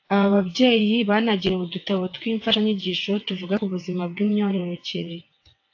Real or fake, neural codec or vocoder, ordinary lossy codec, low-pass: fake; vocoder, 22.05 kHz, 80 mel bands, WaveNeXt; AAC, 48 kbps; 7.2 kHz